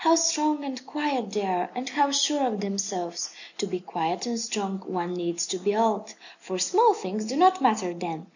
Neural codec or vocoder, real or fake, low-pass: none; real; 7.2 kHz